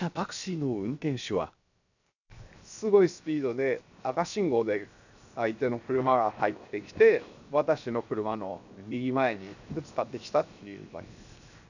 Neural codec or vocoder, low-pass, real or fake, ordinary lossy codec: codec, 16 kHz, 0.7 kbps, FocalCodec; 7.2 kHz; fake; none